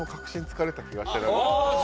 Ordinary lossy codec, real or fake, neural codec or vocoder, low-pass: none; real; none; none